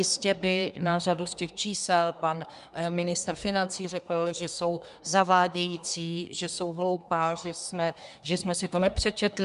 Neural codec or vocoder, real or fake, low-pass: codec, 24 kHz, 1 kbps, SNAC; fake; 10.8 kHz